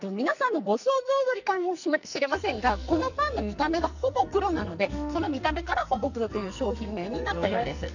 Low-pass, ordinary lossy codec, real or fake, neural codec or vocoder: 7.2 kHz; none; fake; codec, 44.1 kHz, 2.6 kbps, SNAC